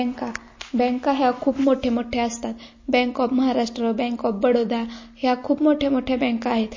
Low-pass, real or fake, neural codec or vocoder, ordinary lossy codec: 7.2 kHz; real; none; MP3, 32 kbps